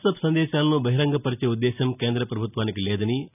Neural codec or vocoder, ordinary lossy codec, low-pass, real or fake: none; none; 3.6 kHz; real